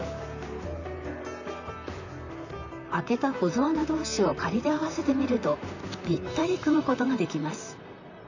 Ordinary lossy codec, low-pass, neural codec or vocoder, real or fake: none; 7.2 kHz; vocoder, 44.1 kHz, 128 mel bands, Pupu-Vocoder; fake